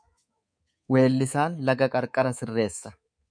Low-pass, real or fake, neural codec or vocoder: 9.9 kHz; fake; codec, 24 kHz, 3.1 kbps, DualCodec